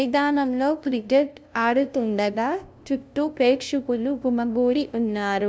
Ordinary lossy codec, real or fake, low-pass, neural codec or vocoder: none; fake; none; codec, 16 kHz, 0.5 kbps, FunCodec, trained on LibriTTS, 25 frames a second